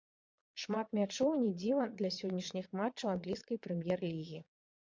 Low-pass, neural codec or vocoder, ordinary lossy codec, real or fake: 7.2 kHz; vocoder, 22.05 kHz, 80 mel bands, Vocos; MP3, 64 kbps; fake